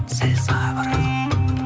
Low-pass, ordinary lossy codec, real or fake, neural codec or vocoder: none; none; real; none